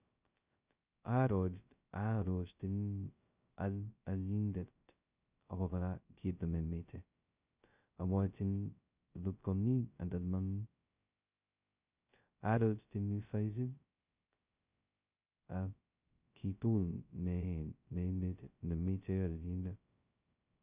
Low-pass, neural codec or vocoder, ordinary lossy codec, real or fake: 3.6 kHz; codec, 16 kHz, 0.2 kbps, FocalCodec; Opus, 64 kbps; fake